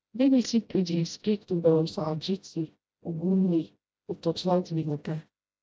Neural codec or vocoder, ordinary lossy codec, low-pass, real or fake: codec, 16 kHz, 0.5 kbps, FreqCodec, smaller model; none; none; fake